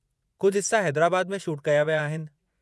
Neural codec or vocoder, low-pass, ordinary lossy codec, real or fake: vocoder, 24 kHz, 100 mel bands, Vocos; none; none; fake